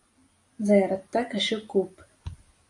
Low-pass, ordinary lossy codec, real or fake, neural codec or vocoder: 10.8 kHz; AAC, 48 kbps; real; none